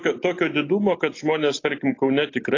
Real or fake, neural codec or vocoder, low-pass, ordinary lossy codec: real; none; 7.2 kHz; AAC, 32 kbps